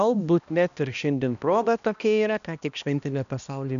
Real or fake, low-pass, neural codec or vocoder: fake; 7.2 kHz; codec, 16 kHz, 1 kbps, X-Codec, HuBERT features, trained on balanced general audio